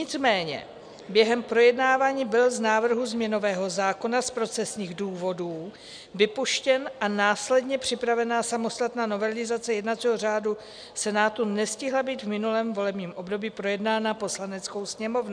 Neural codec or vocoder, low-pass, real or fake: none; 9.9 kHz; real